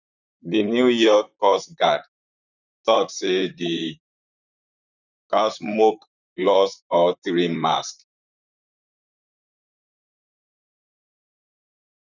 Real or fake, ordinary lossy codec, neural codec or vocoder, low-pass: fake; none; vocoder, 44.1 kHz, 128 mel bands, Pupu-Vocoder; 7.2 kHz